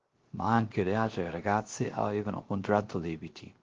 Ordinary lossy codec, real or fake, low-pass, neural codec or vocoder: Opus, 16 kbps; fake; 7.2 kHz; codec, 16 kHz, 0.3 kbps, FocalCodec